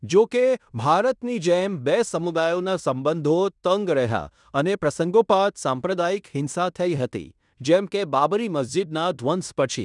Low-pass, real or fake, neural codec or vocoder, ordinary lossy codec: 10.8 kHz; fake; codec, 16 kHz in and 24 kHz out, 0.9 kbps, LongCat-Audio-Codec, fine tuned four codebook decoder; none